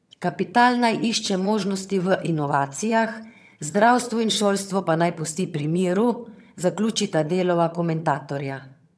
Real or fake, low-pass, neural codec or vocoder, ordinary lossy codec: fake; none; vocoder, 22.05 kHz, 80 mel bands, HiFi-GAN; none